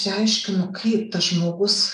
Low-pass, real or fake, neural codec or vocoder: 10.8 kHz; real; none